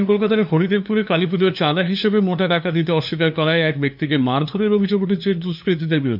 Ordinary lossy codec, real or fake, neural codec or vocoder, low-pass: none; fake; codec, 16 kHz, 2 kbps, FunCodec, trained on LibriTTS, 25 frames a second; 5.4 kHz